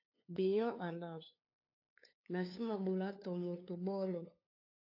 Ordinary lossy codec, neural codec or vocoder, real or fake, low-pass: AAC, 32 kbps; codec, 16 kHz, 2 kbps, FunCodec, trained on LibriTTS, 25 frames a second; fake; 5.4 kHz